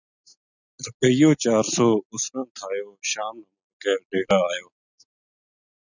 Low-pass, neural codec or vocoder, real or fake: 7.2 kHz; none; real